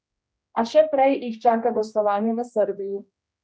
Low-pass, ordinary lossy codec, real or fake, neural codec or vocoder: none; none; fake; codec, 16 kHz, 1 kbps, X-Codec, HuBERT features, trained on general audio